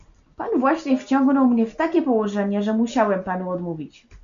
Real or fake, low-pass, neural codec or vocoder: real; 7.2 kHz; none